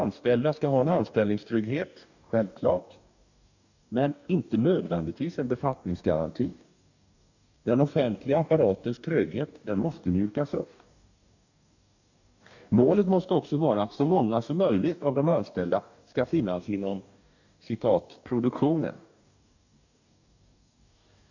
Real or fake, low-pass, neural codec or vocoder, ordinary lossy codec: fake; 7.2 kHz; codec, 44.1 kHz, 2.6 kbps, DAC; none